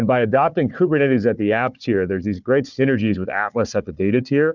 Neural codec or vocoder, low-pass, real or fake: codec, 16 kHz, 4 kbps, FunCodec, trained on Chinese and English, 50 frames a second; 7.2 kHz; fake